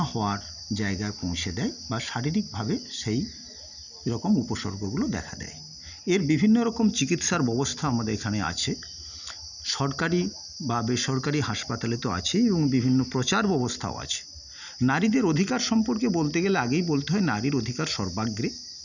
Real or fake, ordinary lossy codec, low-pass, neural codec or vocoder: real; none; 7.2 kHz; none